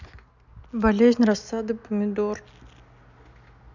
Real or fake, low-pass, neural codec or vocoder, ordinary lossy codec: real; 7.2 kHz; none; none